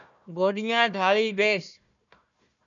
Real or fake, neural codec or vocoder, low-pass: fake; codec, 16 kHz, 1 kbps, FunCodec, trained on Chinese and English, 50 frames a second; 7.2 kHz